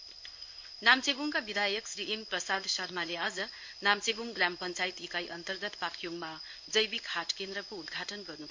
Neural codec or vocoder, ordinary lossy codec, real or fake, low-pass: codec, 16 kHz in and 24 kHz out, 1 kbps, XY-Tokenizer; MP3, 48 kbps; fake; 7.2 kHz